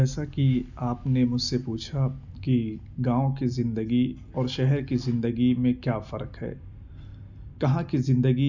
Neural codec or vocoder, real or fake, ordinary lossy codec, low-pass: none; real; none; 7.2 kHz